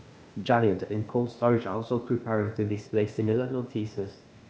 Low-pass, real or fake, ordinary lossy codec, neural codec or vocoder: none; fake; none; codec, 16 kHz, 0.8 kbps, ZipCodec